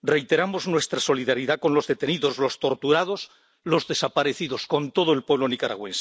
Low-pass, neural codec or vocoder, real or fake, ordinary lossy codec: none; none; real; none